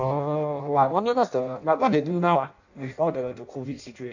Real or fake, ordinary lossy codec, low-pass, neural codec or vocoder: fake; none; 7.2 kHz; codec, 16 kHz in and 24 kHz out, 0.6 kbps, FireRedTTS-2 codec